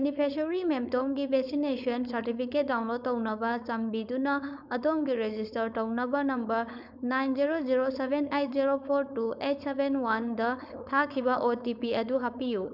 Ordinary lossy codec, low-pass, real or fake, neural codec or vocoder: none; 5.4 kHz; fake; codec, 16 kHz, 4.8 kbps, FACodec